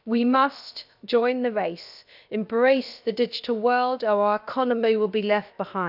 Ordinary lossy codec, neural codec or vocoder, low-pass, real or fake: none; codec, 16 kHz, about 1 kbps, DyCAST, with the encoder's durations; 5.4 kHz; fake